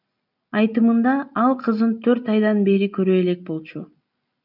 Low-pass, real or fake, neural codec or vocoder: 5.4 kHz; real; none